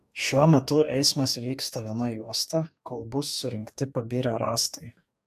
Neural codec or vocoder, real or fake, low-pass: codec, 44.1 kHz, 2.6 kbps, DAC; fake; 14.4 kHz